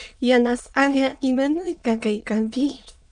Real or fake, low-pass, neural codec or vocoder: fake; 9.9 kHz; autoencoder, 22.05 kHz, a latent of 192 numbers a frame, VITS, trained on many speakers